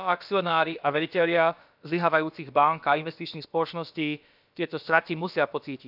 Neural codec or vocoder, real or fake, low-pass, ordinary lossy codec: codec, 16 kHz, about 1 kbps, DyCAST, with the encoder's durations; fake; 5.4 kHz; none